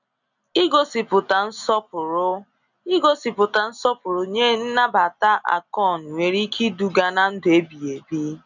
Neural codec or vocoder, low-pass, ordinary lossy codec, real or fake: none; 7.2 kHz; none; real